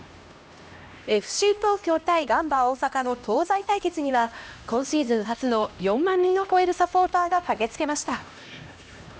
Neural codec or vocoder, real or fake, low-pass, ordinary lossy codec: codec, 16 kHz, 1 kbps, X-Codec, HuBERT features, trained on LibriSpeech; fake; none; none